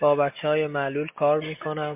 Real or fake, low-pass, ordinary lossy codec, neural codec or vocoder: real; 3.6 kHz; MP3, 32 kbps; none